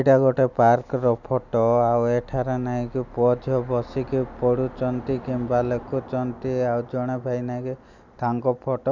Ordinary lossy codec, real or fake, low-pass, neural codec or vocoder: none; real; 7.2 kHz; none